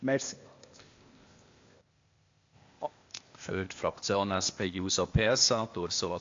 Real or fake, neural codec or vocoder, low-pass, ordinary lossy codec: fake; codec, 16 kHz, 0.8 kbps, ZipCodec; 7.2 kHz; AAC, 48 kbps